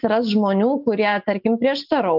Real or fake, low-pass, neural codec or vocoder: real; 5.4 kHz; none